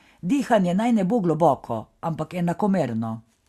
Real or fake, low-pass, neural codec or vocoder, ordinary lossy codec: real; 14.4 kHz; none; Opus, 64 kbps